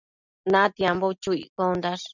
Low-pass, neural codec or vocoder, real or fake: 7.2 kHz; none; real